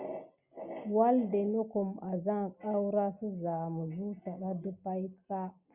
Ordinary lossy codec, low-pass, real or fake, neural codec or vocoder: Opus, 64 kbps; 3.6 kHz; real; none